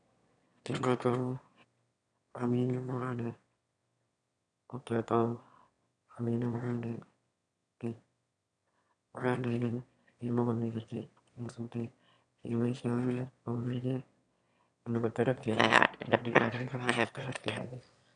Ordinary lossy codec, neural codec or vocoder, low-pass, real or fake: none; autoencoder, 22.05 kHz, a latent of 192 numbers a frame, VITS, trained on one speaker; 9.9 kHz; fake